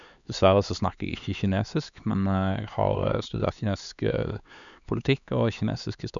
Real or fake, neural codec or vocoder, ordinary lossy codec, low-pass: fake; codec, 16 kHz, 4 kbps, X-Codec, HuBERT features, trained on LibriSpeech; none; 7.2 kHz